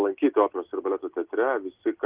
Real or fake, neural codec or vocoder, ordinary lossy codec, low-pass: real; none; Opus, 16 kbps; 3.6 kHz